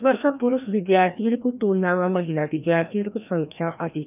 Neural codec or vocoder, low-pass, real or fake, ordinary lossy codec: codec, 16 kHz, 1 kbps, FreqCodec, larger model; 3.6 kHz; fake; none